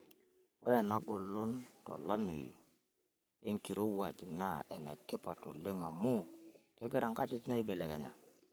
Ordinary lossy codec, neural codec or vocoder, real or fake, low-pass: none; codec, 44.1 kHz, 3.4 kbps, Pupu-Codec; fake; none